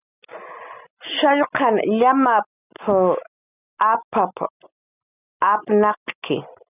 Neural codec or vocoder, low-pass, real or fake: none; 3.6 kHz; real